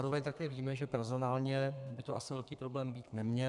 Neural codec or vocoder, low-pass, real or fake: codec, 32 kHz, 1.9 kbps, SNAC; 10.8 kHz; fake